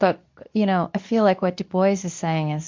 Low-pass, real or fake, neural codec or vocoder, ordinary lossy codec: 7.2 kHz; fake; codec, 24 kHz, 0.9 kbps, WavTokenizer, medium speech release version 2; MP3, 64 kbps